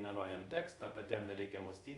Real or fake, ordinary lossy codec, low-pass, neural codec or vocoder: fake; Opus, 64 kbps; 10.8 kHz; codec, 24 kHz, 0.5 kbps, DualCodec